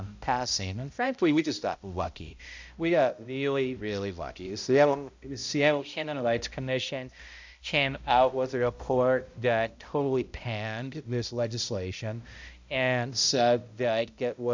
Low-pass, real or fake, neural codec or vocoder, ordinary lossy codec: 7.2 kHz; fake; codec, 16 kHz, 0.5 kbps, X-Codec, HuBERT features, trained on balanced general audio; MP3, 64 kbps